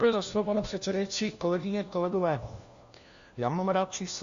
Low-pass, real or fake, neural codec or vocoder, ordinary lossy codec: 7.2 kHz; fake; codec, 16 kHz, 1 kbps, FunCodec, trained on LibriTTS, 50 frames a second; Opus, 64 kbps